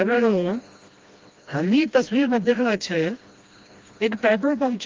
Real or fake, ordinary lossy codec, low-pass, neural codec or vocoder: fake; Opus, 32 kbps; 7.2 kHz; codec, 16 kHz, 1 kbps, FreqCodec, smaller model